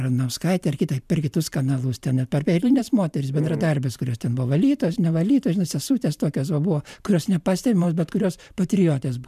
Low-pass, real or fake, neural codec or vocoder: 14.4 kHz; fake; vocoder, 44.1 kHz, 128 mel bands every 512 samples, BigVGAN v2